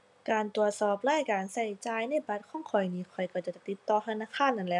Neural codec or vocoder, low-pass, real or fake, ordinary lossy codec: none; 10.8 kHz; real; none